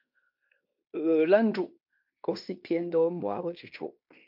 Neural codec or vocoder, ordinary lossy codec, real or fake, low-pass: codec, 16 kHz in and 24 kHz out, 0.9 kbps, LongCat-Audio-Codec, fine tuned four codebook decoder; MP3, 48 kbps; fake; 5.4 kHz